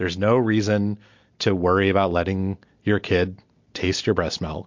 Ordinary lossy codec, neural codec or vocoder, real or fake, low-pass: MP3, 48 kbps; none; real; 7.2 kHz